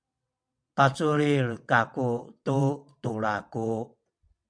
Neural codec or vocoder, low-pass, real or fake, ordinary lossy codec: vocoder, 22.05 kHz, 80 mel bands, WaveNeXt; 9.9 kHz; fake; MP3, 96 kbps